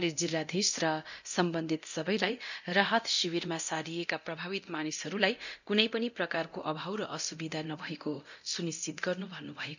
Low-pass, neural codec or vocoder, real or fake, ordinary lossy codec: 7.2 kHz; codec, 24 kHz, 0.9 kbps, DualCodec; fake; none